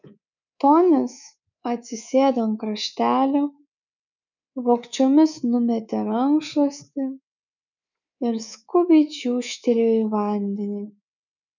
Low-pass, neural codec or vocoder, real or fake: 7.2 kHz; codec, 24 kHz, 3.1 kbps, DualCodec; fake